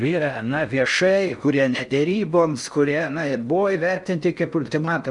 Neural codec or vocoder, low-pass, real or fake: codec, 16 kHz in and 24 kHz out, 0.6 kbps, FocalCodec, streaming, 4096 codes; 10.8 kHz; fake